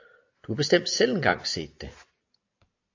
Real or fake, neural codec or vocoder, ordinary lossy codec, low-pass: real; none; AAC, 48 kbps; 7.2 kHz